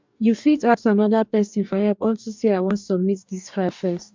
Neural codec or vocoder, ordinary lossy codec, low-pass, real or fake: codec, 44.1 kHz, 2.6 kbps, DAC; MP3, 64 kbps; 7.2 kHz; fake